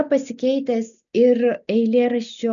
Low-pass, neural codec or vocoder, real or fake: 7.2 kHz; none; real